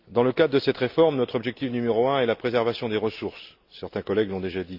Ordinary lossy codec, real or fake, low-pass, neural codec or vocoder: Opus, 64 kbps; real; 5.4 kHz; none